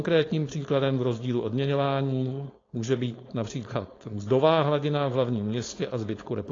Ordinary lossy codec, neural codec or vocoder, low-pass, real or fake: AAC, 32 kbps; codec, 16 kHz, 4.8 kbps, FACodec; 7.2 kHz; fake